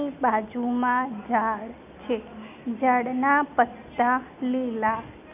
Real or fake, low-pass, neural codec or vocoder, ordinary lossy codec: real; 3.6 kHz; none; none